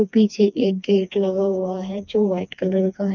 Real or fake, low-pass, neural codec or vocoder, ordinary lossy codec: fake; 7.2 kHz; codec, 16 kHz, 2 kbps, FreqCodec, smaller model; none